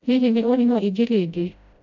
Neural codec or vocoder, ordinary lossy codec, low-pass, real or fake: codec, 16 kHz, 0.5 kbps, FreqCodec, smaller model; none; 7.2 kHz; fake